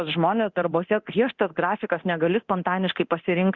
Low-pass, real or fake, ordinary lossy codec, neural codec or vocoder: 7.2 kHz; fake; Opus, 64 kbps; codec, 24 kHz, 0.9 kbps, DualCodec